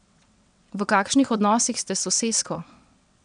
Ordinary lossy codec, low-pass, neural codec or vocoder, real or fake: none; 9.9 kHz; vocoder, 22.05 kHz, 80 mel bands, WaveNeXt; fake